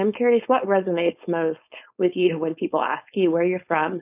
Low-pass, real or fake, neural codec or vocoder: 3.6 kHz; fake; codec, 16 kHz, 4.8 kbps, FACodec